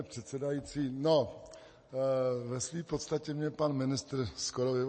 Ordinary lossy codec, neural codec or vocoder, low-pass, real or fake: MP3, 32 kbps; none; 9.9 kHz; real